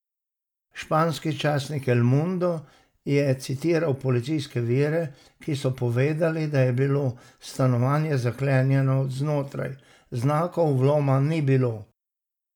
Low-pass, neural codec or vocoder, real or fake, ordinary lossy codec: 19.8 kHz; none; real; none